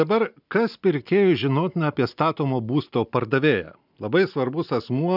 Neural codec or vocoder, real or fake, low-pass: none; real; 5.4 kHz